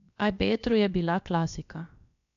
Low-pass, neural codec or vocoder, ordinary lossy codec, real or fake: 7.2 kHz; codec, 16 kHz, about 1 kbps, DyCAST, with the encoder's durations; none; fake